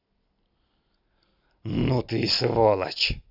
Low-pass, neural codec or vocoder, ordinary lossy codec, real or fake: 5.4 kHz; none; none; real